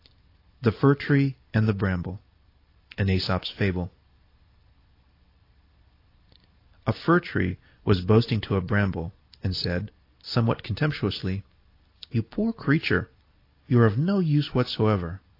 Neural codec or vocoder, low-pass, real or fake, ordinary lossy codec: none; 5.4 kHz; real; AAC, 32 kbps